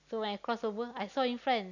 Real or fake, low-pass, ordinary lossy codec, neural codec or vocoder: real; 7.2 kHz; none; none